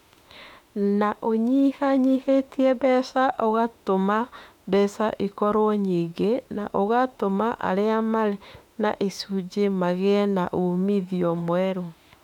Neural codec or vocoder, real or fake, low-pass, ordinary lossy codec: autoencoder, 48 kHz, 32 numbers a frame, DAC-VAE, trained on Japanese speech; fake; 19.8 kHz; MP3, 96 kbps